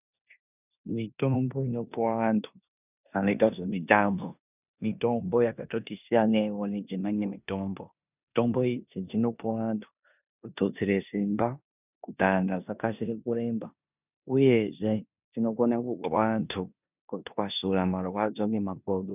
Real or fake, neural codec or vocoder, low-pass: fake; codec, 16 kHz in and 24 kHz out, 0.9 kbps, LongCat-Audio-Codec, four codebook decoder; 3.6 kHz